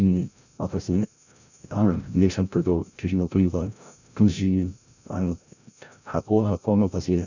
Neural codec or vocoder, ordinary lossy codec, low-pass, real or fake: codec, 16 kHz, 0.5 kbps, FreqCodec, larger model; none; 7.2 kHz; fake